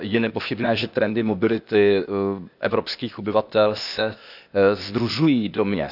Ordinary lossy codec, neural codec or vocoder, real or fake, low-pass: none; codec, 16 kHz, 0.8 kbps, ZipCodec; fake; 5.4 kHz